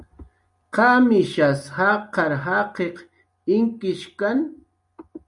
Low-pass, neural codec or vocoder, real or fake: 10.8 kHz; none; real